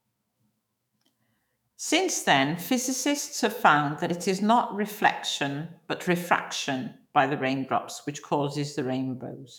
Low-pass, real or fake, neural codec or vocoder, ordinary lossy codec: none; fake; autoencoder, 48 kHz, 128 numbers a frame, DAC-VAE, trained on Japanese speech; none